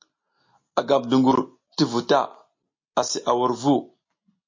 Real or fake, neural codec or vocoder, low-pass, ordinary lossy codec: real; none; 7.2 kHz; MP3, 32 kbps